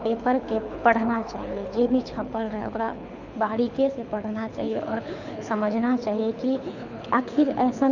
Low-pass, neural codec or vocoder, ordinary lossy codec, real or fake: 7.2 kHz; codec, 24 kHz, 6 kbps, HILCodec; none; fake